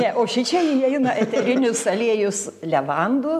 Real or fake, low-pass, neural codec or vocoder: real; 14.4 kHz; none